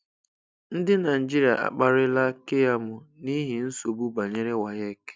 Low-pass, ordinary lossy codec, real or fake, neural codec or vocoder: none; none; real; none